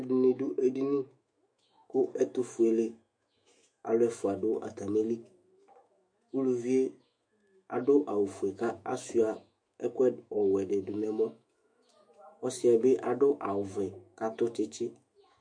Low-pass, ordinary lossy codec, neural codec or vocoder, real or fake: 9.9 kHz; MP3, 48 kbps; autoencoder, 48 kHz, 128 numbers a frame, DAC-VAE, trained on Japanese speech; fake